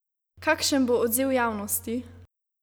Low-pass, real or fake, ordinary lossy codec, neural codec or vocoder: none; real; none; none